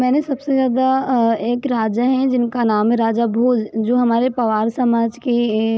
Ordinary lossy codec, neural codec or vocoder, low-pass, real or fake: none; none; none; real